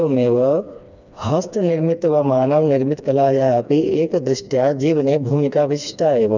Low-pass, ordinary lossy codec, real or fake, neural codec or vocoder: 7.2 kHz; none; fake; codec, 16 kHz, 2 kbps, FreqCodec, smaller model